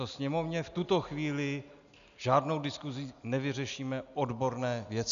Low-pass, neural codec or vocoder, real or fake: 7.2 kHz; none; real